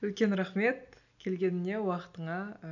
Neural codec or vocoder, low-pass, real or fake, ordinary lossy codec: none; 7.2 kHz; real; none